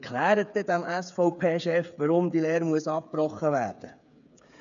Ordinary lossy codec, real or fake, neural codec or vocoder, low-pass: none; fake; codec, 16 kHz, 8 kbps, FreqCodec, smaller model; 7.2 kHz